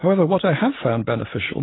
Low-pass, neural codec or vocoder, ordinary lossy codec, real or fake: 7.2 kHz; none; AAC, 16 kbps; real